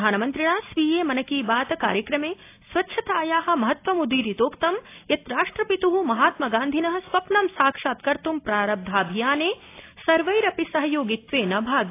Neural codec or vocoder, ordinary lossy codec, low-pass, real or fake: none; AAC, 24 kbps; 3.6 kHz; real